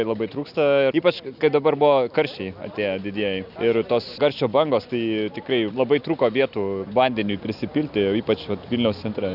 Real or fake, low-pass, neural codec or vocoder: real; 5.4 kHz; none